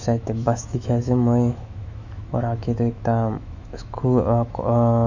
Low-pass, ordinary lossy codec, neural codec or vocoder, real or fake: 7.2 kHz; none; autoencoder, 48 kHz, 128 numbers a frame, DAC-VAE, trained on Japanese speech; fake